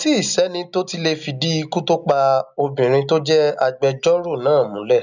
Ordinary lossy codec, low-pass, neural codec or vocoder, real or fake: none; 7.2 kHz; none; real